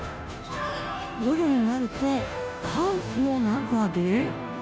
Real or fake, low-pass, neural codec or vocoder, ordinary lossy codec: fake; none; codec, 16 kHz, 0.5 kbps, FunCodec, trained on Chinese and English, 25 frames a second; none